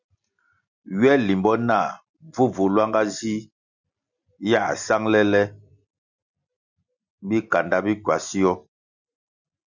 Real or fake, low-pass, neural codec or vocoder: real; 7.2 kHz; none